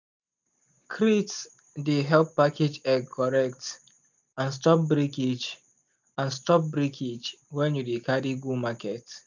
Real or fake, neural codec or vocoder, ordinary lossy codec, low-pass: real; none; none; 7.2 kHz